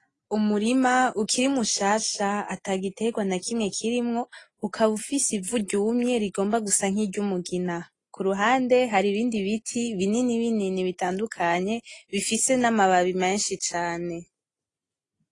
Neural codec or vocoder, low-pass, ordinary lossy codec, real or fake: none; 10.8 kHz; AAC, 32 kbps; real